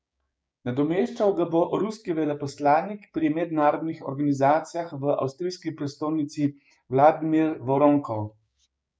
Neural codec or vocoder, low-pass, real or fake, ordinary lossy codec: codec, 16 kHz, 6 kbps, DAC; none; fake; none